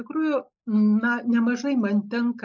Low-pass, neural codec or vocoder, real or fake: 7.2 kHz; none; real